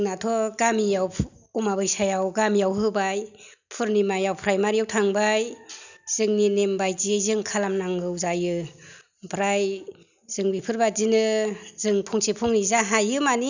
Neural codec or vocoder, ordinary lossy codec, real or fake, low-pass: none; none; real; 7.2 kHz